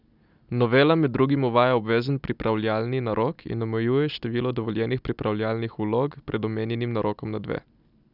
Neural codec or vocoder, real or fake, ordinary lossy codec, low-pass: none; real; none; 5.4 kHz